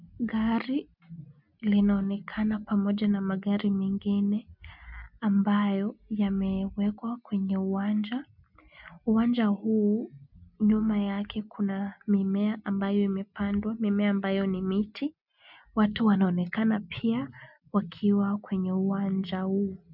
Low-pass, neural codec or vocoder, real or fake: 5.4 kHz; none; real